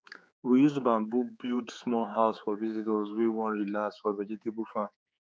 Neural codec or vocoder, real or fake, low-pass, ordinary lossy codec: codec, 16 kHz, 4 kbps, X-Codec, HuBERT features, trained on general audio; fake; none; none